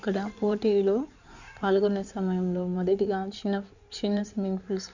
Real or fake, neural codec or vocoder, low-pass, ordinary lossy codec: fake; codec, 16 kHz in and 24 kHz out, 2.2 kbps, FireRedTTS-2 codec; 7.2 kHz; none